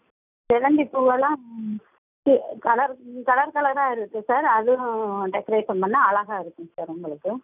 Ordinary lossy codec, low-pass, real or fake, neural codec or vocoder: none; 3.6 kHz; real; none